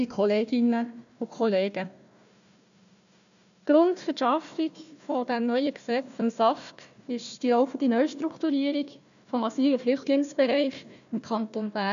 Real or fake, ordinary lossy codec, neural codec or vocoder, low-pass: fake; none; codec, 16 kHz, 1 kbps, FunCodec, trained on Chinese and English, 50 frames a second; 7.2 kHz